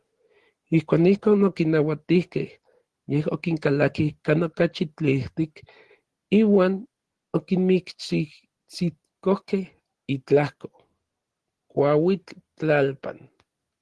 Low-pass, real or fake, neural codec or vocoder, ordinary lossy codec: 10.8 kHz; real; none; Opus, 16 kbps